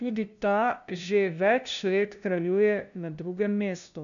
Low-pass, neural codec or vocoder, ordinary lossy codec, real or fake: 7.2 kHz; codec, 16 kHz, 0.5 kbps, FunCodec, trained on LibriTTS, 25 frames a second; none; fake